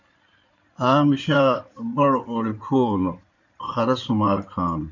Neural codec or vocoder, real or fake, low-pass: codec, 16 kHz in and 24 kHz out, 2.2 kbps, FireRedTTS-2 codec; fake; 7.2 kHz